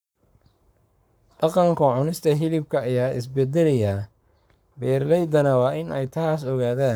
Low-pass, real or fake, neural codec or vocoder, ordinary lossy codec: none; fake; codec, 44.1 kHz, 7.8 kbps, Pupu-Codec; none